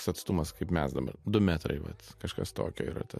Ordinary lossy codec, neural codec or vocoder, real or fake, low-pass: MP3, 64 kbps; none; real; 14.4 kHz